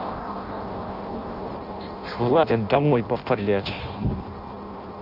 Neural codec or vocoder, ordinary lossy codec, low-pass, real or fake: codec, 16 kHz in and 24 kHz out, 0.6 kbps, FireRedTTS-2 codec; none; 5.4 kHz; fake